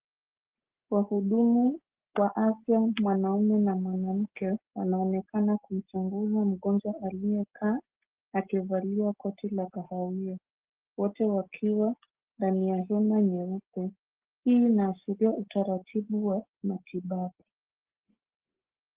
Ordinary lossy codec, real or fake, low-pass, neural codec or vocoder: Opus, 16 kbps; real; 3.6 kHz; none